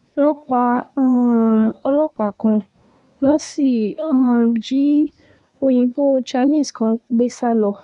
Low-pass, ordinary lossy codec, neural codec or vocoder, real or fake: 10.8 kHz; none; codec, 24 kHz, 1 kbps, SNAC; fake